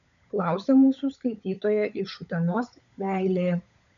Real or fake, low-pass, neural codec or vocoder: fake; 7.2 kHz; codec, 16 kHz, 16 kbps, FunCodec, trained on LibriTTS, 50 frames a second